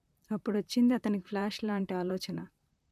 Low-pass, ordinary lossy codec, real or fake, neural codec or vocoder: 14.4 kHz; MP3, 96 kbps; fake; vocoder, 44.1 kHz, 128 mel bands, Pupu-Vocoder